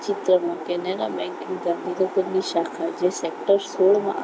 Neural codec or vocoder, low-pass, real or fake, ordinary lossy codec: none; none; real; none